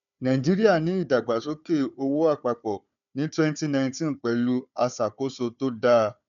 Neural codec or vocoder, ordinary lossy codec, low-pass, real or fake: codec, 16 kHz, 4 kbps, FunCodec, trained on Chinese and English, 50 frames a second; none; 7.2 kHz; fake